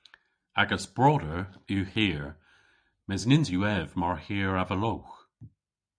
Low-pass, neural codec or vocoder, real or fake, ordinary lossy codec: 9.9 kHz; none; real; MP3, 96 kbps